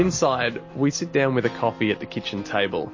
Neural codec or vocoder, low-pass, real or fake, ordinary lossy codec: none; 7.2 kHz; real; MP3, 32 kbps